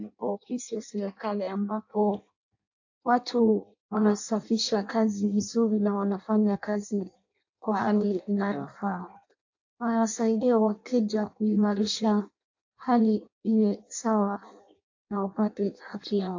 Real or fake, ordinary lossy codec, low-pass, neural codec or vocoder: fake; AAC, 48 kbps; 7.2 kHz; codec, 16 kHz in and 24 kHz out, 0.6 kbps, FireRedTTS-2 codec